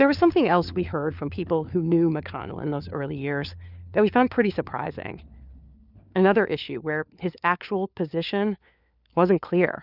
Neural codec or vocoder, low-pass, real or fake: codec, 16 kHz, 4 kbps, FunCodec, trained on LibriTTS, 50 frames a second; 5.4 kHz; fake